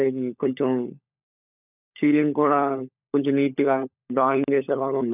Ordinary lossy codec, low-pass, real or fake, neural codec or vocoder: none; 3.6 kHz; fake; codec, 16 kHz, 16 kbps, FunCodec, trained on LibriTTS, 50 frames a second